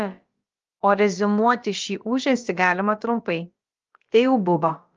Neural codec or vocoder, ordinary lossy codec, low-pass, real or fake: codec, 16 kHz, about 1 kbps, DyCAST, with the encoder's durations; Opus, 32 kbps; 7.2 kHz; fake